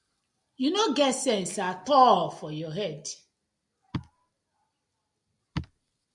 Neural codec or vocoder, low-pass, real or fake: none; 10.8 kHz; real